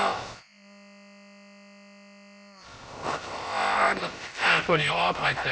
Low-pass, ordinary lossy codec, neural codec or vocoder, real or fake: none; none; codec, 16 kHz, about 1 kbps, DyCAST, with the encoder's durations; fake